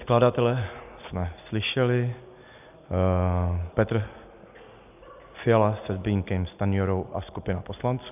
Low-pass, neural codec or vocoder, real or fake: 3.6 kHz; none; real